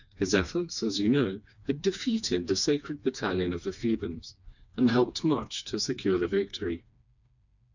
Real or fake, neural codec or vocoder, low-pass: fake; codec, 16 kHz, 2 kbps, FreqCodec, smaller model; 7.2 kHz